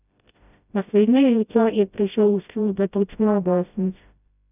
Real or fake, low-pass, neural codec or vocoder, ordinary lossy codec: fake; 3.6 kHz; codec, 16 kHz, 0.5 kbps, FreqCodec, smaller model; none